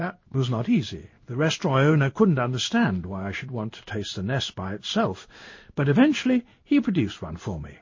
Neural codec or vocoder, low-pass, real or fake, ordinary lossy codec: codec, 16 kHz in and 24 kHz out, 1 kbps, XY-Tokenizer; 7.2 kHz; fake; MP3, 32 kbps